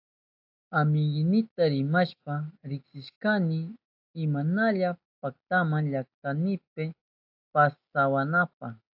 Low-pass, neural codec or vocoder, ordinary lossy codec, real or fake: 5.4 kHz; none; AAC, 48 kbps; real